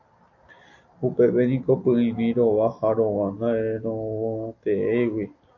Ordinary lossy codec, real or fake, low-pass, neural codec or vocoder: AAC, 48 kbps; real; 7.2 kHz; none